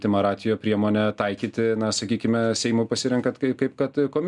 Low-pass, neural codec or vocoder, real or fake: 10.8 kHz; none; real